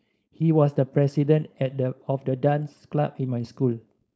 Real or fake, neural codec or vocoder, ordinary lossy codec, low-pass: fake; codec, 16 kHz, 4.8 kbps, FACodec; none; none